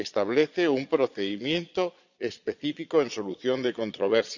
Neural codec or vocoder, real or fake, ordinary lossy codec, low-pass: vocoder, 22.05 kHz, 80 mel bands, Vocos; fake; none; 7.2 kHz